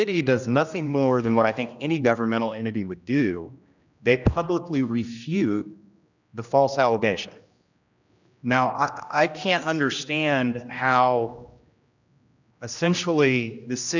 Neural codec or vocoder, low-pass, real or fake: codec, 16 kHz, 1 kbps, X-Codec, HuBERT features, trained on general audio; 7.2 kHz; fake